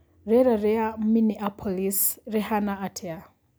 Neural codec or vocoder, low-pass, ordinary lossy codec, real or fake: none; none; none; real